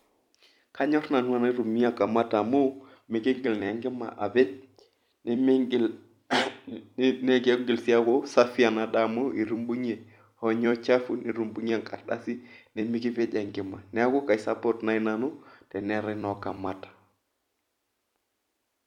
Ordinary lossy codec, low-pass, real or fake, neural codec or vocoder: none; 19.8 kHz; fake; vocoder, 44.1 kHz, 128 mel bands every 256 samples, BigVGAN v2